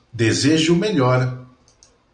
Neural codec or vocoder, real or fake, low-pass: none; real; 9.9 kHz